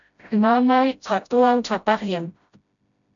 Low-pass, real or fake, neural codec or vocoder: 7.2 kHz; fake; codec, 16 kHz, 0.5 kbps, FreqCodec, smaller model